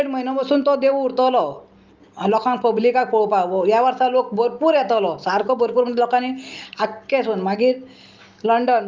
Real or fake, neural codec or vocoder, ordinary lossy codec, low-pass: real; none; Opus, 32 kbps; 7.2 kHz